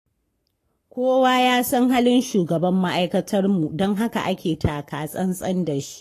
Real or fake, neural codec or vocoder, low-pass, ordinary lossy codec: fake; codec, 44.1 kHz, 7.8 kbps, Pupu-Codec; 14.4 kHz; AAC, 48 kbps